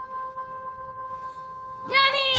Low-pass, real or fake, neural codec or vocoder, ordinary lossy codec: none; fake; codec, 16 kHz, 2 kbps, FunCodec, trained on Chinese and English, 25 frames a second; none